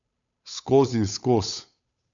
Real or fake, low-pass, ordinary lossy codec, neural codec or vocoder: fake; 7.2 kHz; none; codec, 16 kHz, 8 kbps, FunCodec, trained on Chinese and English, 25 frames a second